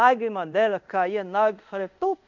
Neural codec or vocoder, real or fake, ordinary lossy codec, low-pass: codec, 24 kHz, 0.5 kbps, DualCodec; fake; none; 7.2 kHz